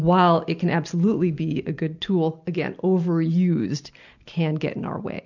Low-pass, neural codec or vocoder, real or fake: 7.2 kHz; none; real